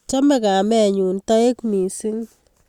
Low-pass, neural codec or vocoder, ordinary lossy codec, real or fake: 19.8 kHz; none; Opus, 64 kbps; real